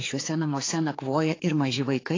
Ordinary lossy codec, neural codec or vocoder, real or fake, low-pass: AAC, 32 kbps; codec, 16 kHz, 4 kbps, X-Codec, HuBERT features, trained on general audio; fake; 7.2 kHz